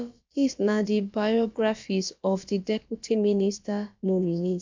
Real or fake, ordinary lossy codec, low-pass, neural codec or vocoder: fake; MP3, 64 kbps; 7.2 kHz; codec, 16 kHz, about 1 kbps, DyCAST, with the encoder's durations